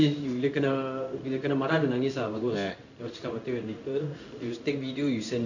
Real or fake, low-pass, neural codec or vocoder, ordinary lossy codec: fake; 7.2 kHz; codec, 16 kHz in and 24 kHz out, 1 kbps, XY-Tokenizer; none